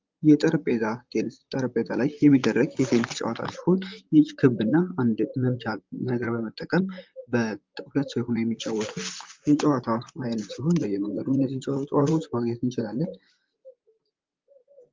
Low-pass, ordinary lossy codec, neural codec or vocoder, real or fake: 7.2 kHz; Opus, 24 kbps; vocoder, 44.1 kHz, 128 mel bands, Pupu-Vocoder; fake